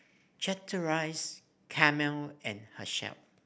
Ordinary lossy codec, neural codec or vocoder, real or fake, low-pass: none; none; real; none